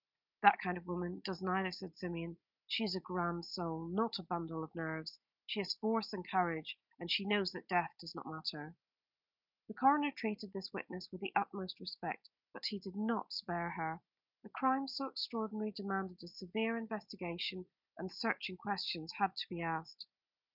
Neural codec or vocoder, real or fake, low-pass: none; real; 5.4 kHz